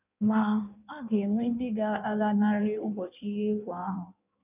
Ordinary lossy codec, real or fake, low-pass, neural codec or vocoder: none; fake; 3.6 kHz; codec, 16 kHz in and 24 kHz out, 1.1 kbps, FireRedTTS-2 codec